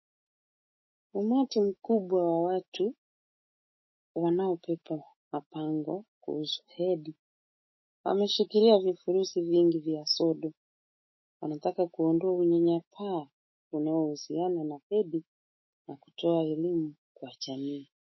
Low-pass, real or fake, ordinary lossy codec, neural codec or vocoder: 7.2 kHz; fake; MP3, 24 kbps; autoencoder, 48 kHz, 128 numbers a frame, DAC-VAE, trained on Japanese speech